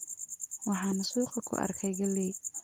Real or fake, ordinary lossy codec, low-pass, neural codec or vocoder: real; Opus, 24 kbps; 19.8 kHz; none